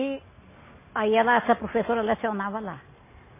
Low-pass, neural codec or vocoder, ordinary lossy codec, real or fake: 3.6 kHz; none; MP3, 16 kbps; real